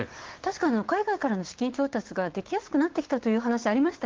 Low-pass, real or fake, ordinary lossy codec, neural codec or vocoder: 7.2 kHz; fake; Opus, 16 kbps; codec, 16 kHz, 6 kbps, DAC